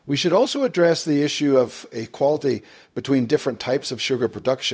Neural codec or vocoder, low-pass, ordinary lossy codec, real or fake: codec, 16 kHz, 0.4 kbps, LongCat-Audio-Codec; none; none; fake